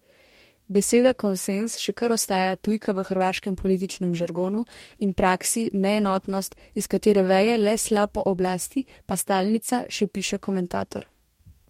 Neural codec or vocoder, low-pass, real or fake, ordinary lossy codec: codec, 44.1 kHz, 2.6 kbps, DAC; 19.8 kHz; fake; MP3, 64 kbps